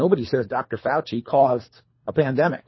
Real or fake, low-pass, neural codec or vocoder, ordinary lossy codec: fake; 7.2 kHz; codec, 24 kHz, 3 kbps, HILCodec; MP3, 24 kbps